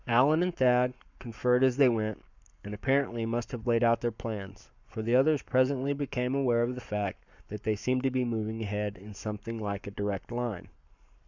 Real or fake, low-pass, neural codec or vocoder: fake; 7.2 kHz; codec, 44.1 kHz, 7.8 kbps, Pupu-Codec